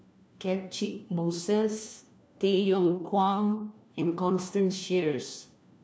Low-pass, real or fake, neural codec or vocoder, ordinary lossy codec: none; fake; codec, 16 kHz, 1 kbps, FunCodec, trained on LibriTTS, 50 frames a second; none